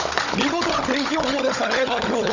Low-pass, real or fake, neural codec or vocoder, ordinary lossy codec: 7.2 kHz; fake; codec, 16 kHz, 16 kbps, FunCodec, trained on Chinese and English, 50 frames a second; none